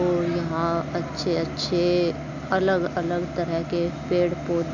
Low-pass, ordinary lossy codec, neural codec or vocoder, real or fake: 7.2 kHz; none; none; real